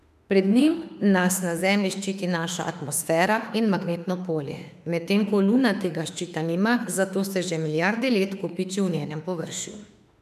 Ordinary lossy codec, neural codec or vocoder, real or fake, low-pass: none; autoencoder, 48 kHz, 32 numbers a frame, DAC-VAE, trained on Japanese speech; fake; 14.4 kHz